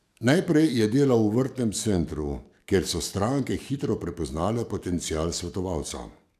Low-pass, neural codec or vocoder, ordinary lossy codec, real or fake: 14.4 kHz; codec, 44.1 kHz, 7.8 kbps, DAC; none; fake